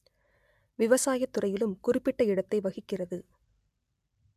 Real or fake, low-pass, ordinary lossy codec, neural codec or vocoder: real; 14.4 kHz; MP3, 96 kbps; none